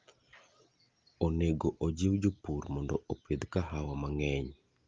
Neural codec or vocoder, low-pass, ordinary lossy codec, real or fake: none; 7.2 kHz; Opus, 32 kbps; real